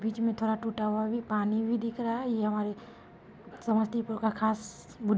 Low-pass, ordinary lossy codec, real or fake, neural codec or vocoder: none; none; real; none